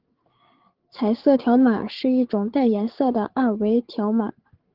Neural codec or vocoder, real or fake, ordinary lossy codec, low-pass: codec, 16 kHz, 8 kbps, FreqCodec, larger model; fake; Opus, 16 kbps; 5.4 kHz